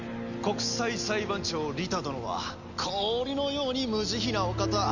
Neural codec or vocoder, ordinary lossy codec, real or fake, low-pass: none; none; real; 7.2 kHz